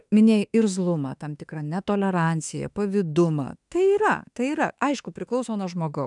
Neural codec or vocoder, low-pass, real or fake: autoencoder, 48 kHz, 32 numbers a frame, DAC-VAE, trained on Japanese speech; 10.8 kHz; fake